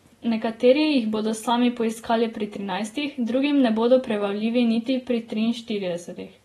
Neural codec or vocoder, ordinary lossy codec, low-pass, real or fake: none; AAC, 32 kbps; 19.8 kHz; real